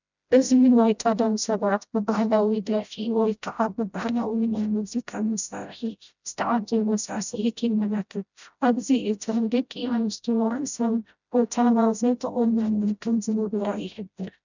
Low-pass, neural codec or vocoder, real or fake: 7.2 kHz; codec, 16 kHz, 0.5 kbps, FreqCodec, smaller model; fake